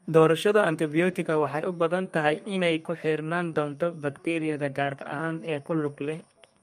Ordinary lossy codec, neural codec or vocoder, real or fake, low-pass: MP3, 64 kbps; codec, 32 kHz, 1.9 kbps, SNAC; fake; 14.4 kHz